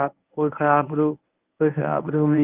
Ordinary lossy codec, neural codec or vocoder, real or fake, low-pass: Opus, 24 kbps; codec, 24 kHz, 0.9 kbps, WavTokenizer, medium speech release version 1; fake; 3.6 kHz